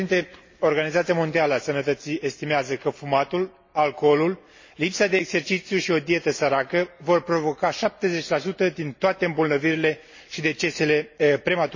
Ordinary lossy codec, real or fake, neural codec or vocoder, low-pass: MP3, 32 kbps; real; none; 7.2 kHz